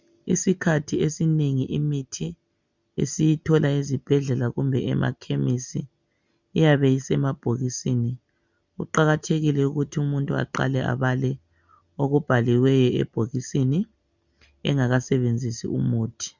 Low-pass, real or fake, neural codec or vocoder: 7.2 kHz; real; none